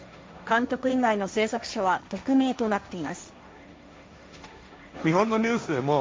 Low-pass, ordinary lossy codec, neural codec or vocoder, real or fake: none; none; codec, 16 kHz, 1.1 kbps, Voila-Tokenizer; fake